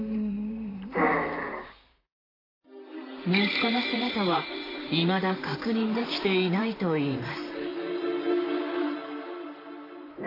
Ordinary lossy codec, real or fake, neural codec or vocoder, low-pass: AAC, 24 kbps; fake; vocoder, 44.1 kHz, 128 mel bands, Pupu-Vocoder; 5.4 kHz